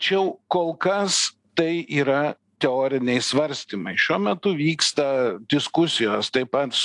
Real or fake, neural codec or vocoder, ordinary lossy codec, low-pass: real; none; AAC, 64 kbps; 10.8 kHz